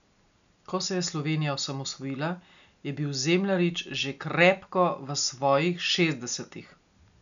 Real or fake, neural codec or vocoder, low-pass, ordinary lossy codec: real; none; 7.2 kHz; none